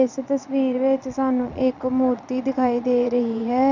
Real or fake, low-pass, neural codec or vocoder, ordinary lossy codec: fake; 7.2 kHz; vocoder, 22.05 kHz, 80 mel bands, Vocos; none